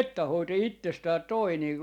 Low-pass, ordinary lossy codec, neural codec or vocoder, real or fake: 19.8 kHz; none; none; real